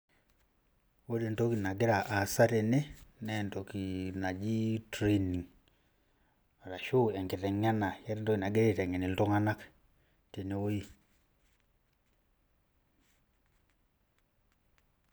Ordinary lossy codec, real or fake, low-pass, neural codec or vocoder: none; real; none; none